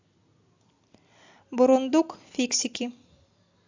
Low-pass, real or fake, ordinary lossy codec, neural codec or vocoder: 7.2 kHz; real; AAC, 48 kbps; none